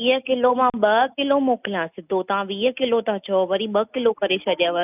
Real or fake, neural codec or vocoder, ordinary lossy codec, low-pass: real; none; none; 3.6 kHz